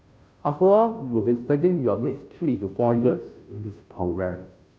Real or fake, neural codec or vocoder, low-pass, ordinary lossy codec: fake; codec, 16 kHz, 0.5 kbps, FunCodec, trained on Chinese and English, 25 frames a second; none; none